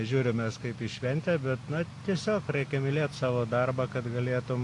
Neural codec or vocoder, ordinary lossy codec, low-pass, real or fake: none; AAC, 48 kbps; 10.8 kHz; real